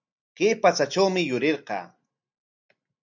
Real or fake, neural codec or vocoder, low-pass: real; none; 7.2 kHz